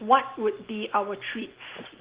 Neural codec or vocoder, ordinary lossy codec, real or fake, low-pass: none; Opus, 16 kbps; real; 3.6 kHz